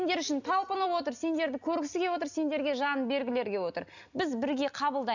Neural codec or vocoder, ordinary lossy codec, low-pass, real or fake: none; none; 7.2 kHz; real